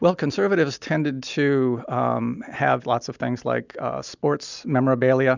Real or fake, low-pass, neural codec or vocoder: real; 7.2 kHz; none